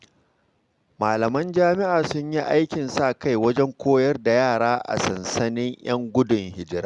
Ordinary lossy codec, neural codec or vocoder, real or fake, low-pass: Opus, 64 kbps; none; real; 10.8 kHz